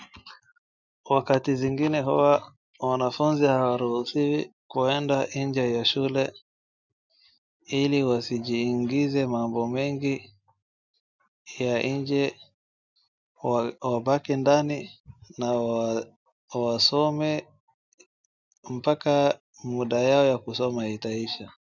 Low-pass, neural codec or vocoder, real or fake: 7.2 kHz; none; real